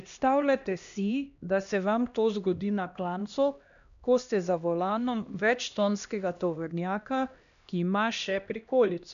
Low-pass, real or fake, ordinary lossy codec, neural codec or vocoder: 7.2 kHz; fake; none; codec, 16 kHz, 1 kbps, X-Codec, HuBERT features, trained on LibriSpeech